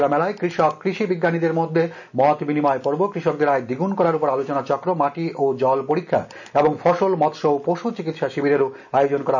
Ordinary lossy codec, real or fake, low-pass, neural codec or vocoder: none; real; 7.2 kHz; none